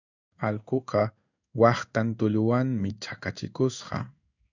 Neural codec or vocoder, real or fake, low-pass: codec, 16 kHz in and 24 kHz out, 1 kbps, XY-Tokenizer; fake; 7.2 kHz